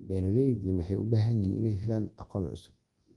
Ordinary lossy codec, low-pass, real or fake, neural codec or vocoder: Opus, 24 kbps; 10.8 kHz; fake; codec, 24 kHz, 0.9 kbps, WavTokenizer, large speech release